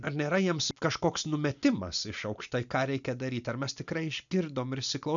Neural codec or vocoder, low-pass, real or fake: none; 7.2 kHz; real